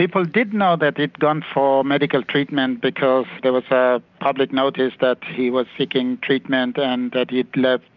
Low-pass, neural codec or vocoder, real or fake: 7.2 kHz; none; real